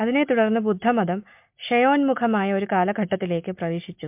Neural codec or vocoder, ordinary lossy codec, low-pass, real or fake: none; MP3, 32 kbps; 3.6 kHz; real